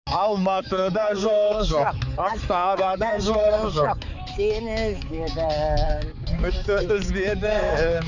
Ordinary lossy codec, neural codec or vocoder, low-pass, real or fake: none; codec, 16 kHz, 4 kbps, X-Codec, HuBERT features, trained on balanced general audio; 7.2 kHz; fake